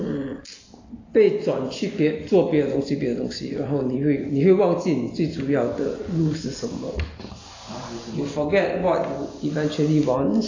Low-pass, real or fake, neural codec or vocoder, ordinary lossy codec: 7.2 kHz; real; none; AAC, 32 kbps